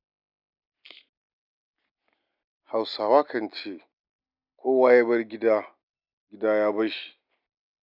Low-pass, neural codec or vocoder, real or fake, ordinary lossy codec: 5.4 kHz; none; real; none